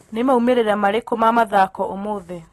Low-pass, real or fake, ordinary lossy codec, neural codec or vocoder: 19.8 kHz; real; AAC, 32 kbps; none